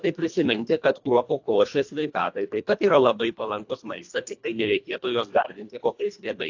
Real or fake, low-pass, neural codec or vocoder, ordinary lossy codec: fake; 7.2 kHz; codec, 24 kHz, 1.5 kbps, HILCodec; AAC, 48 kbps